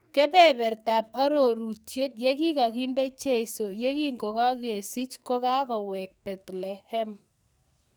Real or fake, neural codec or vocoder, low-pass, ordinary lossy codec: fake; codec, 44.1 kHz, 2.6 kbps, SNAC; none; none